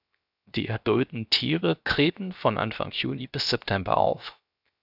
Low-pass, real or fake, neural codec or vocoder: 5.4 kHz; fake; codec, 16 kHz, 0.7 kbps, FocalCodec